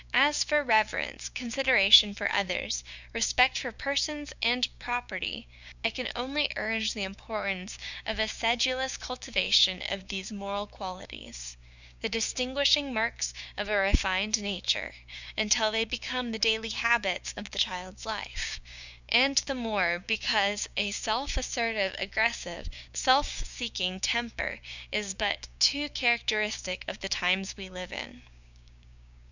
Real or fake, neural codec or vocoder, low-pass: fake; codec, 16 kHz, 6 kbps, DAC; 7.2 kHz